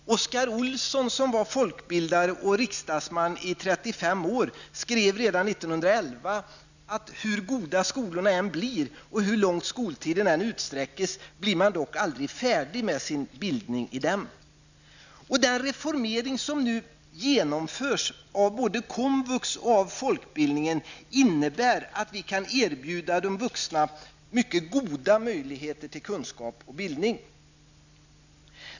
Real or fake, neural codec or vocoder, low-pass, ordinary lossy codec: real; none; 7.2 kHz; none